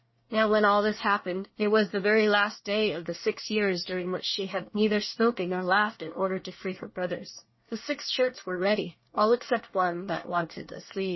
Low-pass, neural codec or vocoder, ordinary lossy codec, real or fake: 7.2 kHz; codec, 24 kHz, 1 kbps, SNAC; MP3, 24 kbps; fake